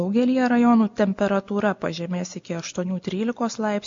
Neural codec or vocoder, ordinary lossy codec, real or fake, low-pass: none; MP3, 48 kbps; real; 7.2 kHz